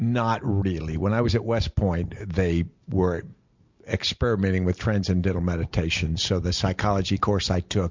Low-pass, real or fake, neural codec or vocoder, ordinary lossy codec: 7.2 kHz; real; none; MP3, 64 kbps